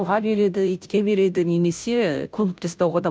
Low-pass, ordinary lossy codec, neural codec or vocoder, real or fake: none; none; codec, 16 kHz, 0.5 kbps, FunCodec, trained on Chinese and English, 25 frames a second; fake